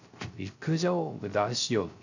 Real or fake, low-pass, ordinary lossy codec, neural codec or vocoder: fake; 7.2 kHz; none; codec, 16 kHz, 0.3 kbps, FocalCodec